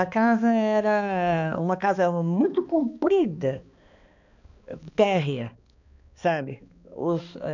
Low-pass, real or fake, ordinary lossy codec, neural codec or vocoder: 7.2 kHz; fake; none; codec, 16 kHz, 2 kbps, X-Codec, HuBERT features, trained on balanced general audio